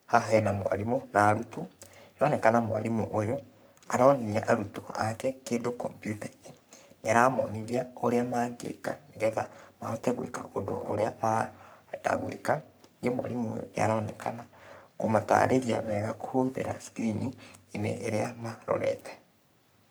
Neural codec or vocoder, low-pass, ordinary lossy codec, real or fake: codec, 44.1 kHz, 3.4 kbps, Pupu-Codec; none; none; fake